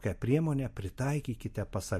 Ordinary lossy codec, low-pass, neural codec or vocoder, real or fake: MP3, 64 kbps; 14.4 kHz; vocoder, 44.1 kHz, 128 mel bands every 256 samples, BigVGAN v2; fake